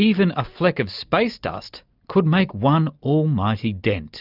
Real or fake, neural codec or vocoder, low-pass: real; none; 5.4 kHz